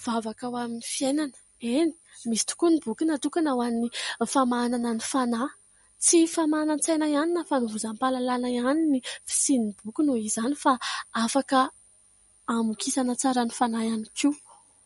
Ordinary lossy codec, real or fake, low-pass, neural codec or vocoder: MP3, 48 kbps; real; 19.8 kHz; none